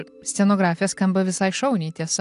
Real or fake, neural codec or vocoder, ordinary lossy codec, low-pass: real; none; AAC, 64 kbps; 10.8 kHz